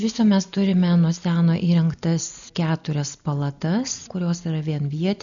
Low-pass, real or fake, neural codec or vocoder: 7.2 kHz; real; none